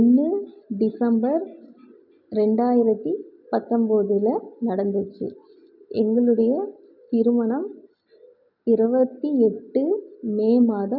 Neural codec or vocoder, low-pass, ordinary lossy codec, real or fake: none; 5.4 kHz; none; real